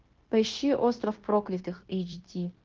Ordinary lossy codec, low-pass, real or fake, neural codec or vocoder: Opus, 16 kbps; 7.2 kHz; fake; codec, 16 kHz, 0.9 kbps, LongCat-Audio-Codec